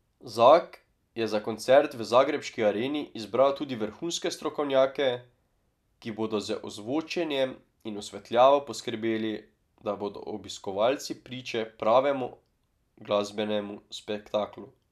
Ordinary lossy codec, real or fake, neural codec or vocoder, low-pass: none; real; none; 14.4 kHz